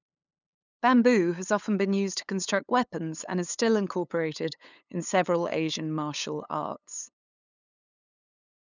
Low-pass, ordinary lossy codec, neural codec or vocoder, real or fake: 7.2 kHz; none; codec, 16 kHz, 8 kbps, FunCodec, trained on LibriTTS, 25 frames a second; fake